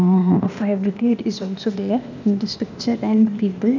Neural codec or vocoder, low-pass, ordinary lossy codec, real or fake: codec, 16 kHz, 0.8 kbps, ZipCodec; 7.2 kHz; none; fake